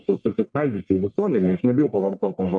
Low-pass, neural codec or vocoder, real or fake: 9.9 kHz; codec, 44.1 kHz, 1.7 kbps, Pupu-Codec; fake